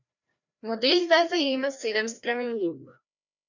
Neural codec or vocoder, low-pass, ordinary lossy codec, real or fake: codec, 16 kHz, 1 kbps, FreqCodec, larger model; 7.2 kHz; none; fake